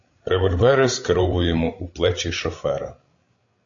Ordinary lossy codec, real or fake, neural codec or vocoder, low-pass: AAC, 48 kbps; fake; codec, 16 kHz, 16 kbps, FreqCodec, larger model; 7.2 kHz